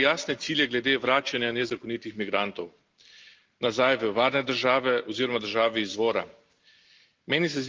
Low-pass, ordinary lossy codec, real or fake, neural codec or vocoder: 7.2 kHz; Opus, 32 kbps; real; none